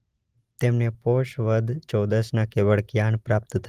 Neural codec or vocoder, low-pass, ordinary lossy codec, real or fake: none; 14.4 kHz; Opus, 24 kbps; real